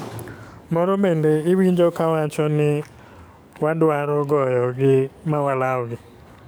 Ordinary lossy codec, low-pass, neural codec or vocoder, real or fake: none; none; codec, 44.1 kHz, 7.8 kbps, DAC; fake